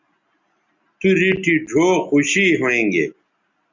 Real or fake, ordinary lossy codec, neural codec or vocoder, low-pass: real; Opus, 64 kbps; none; 7.2 kHz